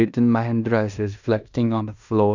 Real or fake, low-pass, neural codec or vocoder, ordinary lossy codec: fake; 7.2 kHz; codec, 16 kHz in and 24 kHz out, 0.9 kbps, LongCat-Audio-Codec, fine tuned four codebook decoder; none